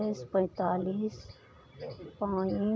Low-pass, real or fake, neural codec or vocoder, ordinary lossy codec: none; real; none; none